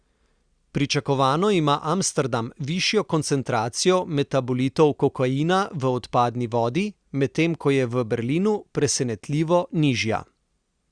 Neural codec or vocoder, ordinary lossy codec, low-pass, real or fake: none; Opus, 64 kbps; 9.9 kHz; real